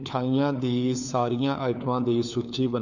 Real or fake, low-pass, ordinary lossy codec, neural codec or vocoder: fake; 7.2 kHz; none; codec, 16 kHz, 4 kbps, FunCodec, trained on LibriTTS, 50 frames a second